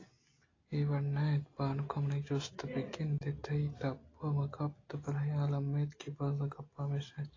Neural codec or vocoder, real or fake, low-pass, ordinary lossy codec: none; real; 7.2 kHz; AAC, 32 kbps